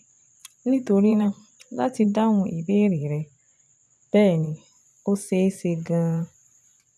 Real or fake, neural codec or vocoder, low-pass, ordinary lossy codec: fake; vocoder, 24 kHz, 100 mel bands, Vocos; none; none